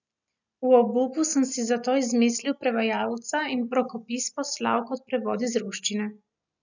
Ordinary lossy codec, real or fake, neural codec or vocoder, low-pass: none; real; none; 7.2 kHz